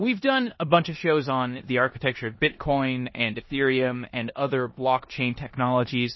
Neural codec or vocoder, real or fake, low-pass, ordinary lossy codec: codec, 16 kHz in and 24 kHz out, 0.9 kbps, LongCat-Audio-Codec, four codebook decoder; fake; 7.2 kHz; MP3, 24 kbps